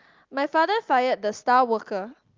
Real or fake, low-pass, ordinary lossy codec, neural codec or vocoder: real; 7.2 kHz; Opus, 24 kbps; none